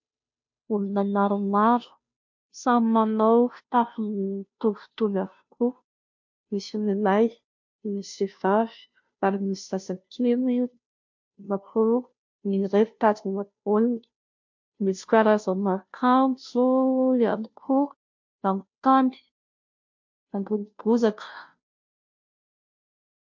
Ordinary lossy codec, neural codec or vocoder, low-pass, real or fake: MP3, 48 kbps; codec, 16 kHz, 0.5 kbps, FunCodec, trained on Chinese and English, 25 frames a second; 7.2 kHz; fake